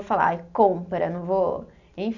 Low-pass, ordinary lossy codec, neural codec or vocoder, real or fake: 7.2 kHz; none; none; real